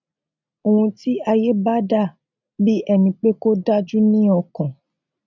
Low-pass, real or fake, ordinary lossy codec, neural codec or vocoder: 7.2 kHz; real; none; none